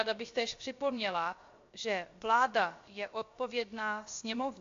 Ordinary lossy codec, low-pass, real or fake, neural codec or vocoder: AAC, 48 kbps; 7.2 kHz; fake; codec, 16 kHz, 0.7 kbps, FocalCodec